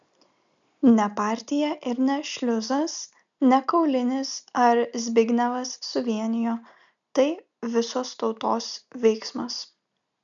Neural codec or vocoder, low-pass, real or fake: none; 7.2 kHz; real